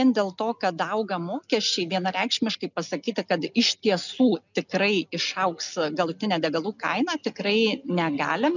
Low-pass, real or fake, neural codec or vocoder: 7.2 kHz; real; none